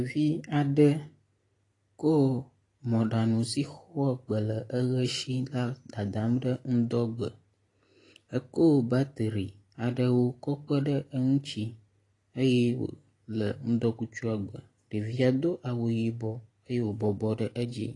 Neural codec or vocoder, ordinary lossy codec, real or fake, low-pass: none; AAC, 32 kbps; real; 10.8 kHz